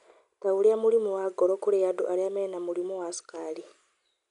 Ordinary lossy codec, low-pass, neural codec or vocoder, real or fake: none; 10.8 kHz; none; real